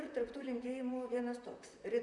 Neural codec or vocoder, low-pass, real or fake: vocoder, 44.1 kHz, 128 mel bands, Pupu-Vocoder; 10.8 kHz; fake